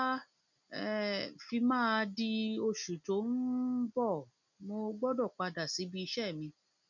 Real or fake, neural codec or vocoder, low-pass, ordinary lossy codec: real; none; 7.2 kHz; none